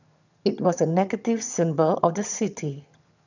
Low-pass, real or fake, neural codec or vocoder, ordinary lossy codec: 7.2 kHz; fake; vocoder, 22.05 kHz, 80 mel bands, HiFi-GAN; none